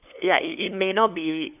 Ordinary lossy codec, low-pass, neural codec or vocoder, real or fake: none; 3.6 kHz; codec, 16 kHz, 2 kbps, FunCodec, trained on LibriTTS, 25 frames a second; fake